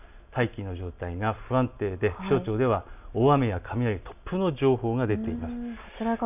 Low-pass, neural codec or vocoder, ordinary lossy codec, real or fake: 3.6 kHz; none; none; real